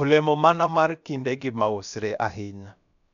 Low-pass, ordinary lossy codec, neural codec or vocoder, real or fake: 7.2 kHz; none; codec, 16 kHz, about 1 kbps, DyCAST, with the encoder's durations; fake